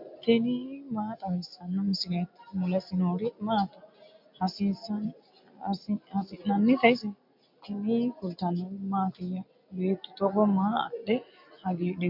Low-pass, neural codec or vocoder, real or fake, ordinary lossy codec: 5.4 kHz; none; real; MP3, 48 kbps